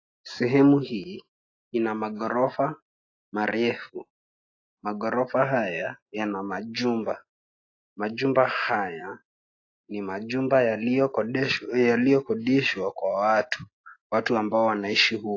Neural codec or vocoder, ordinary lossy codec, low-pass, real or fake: none; AAC, 32 kbps; 7.2 kHz; real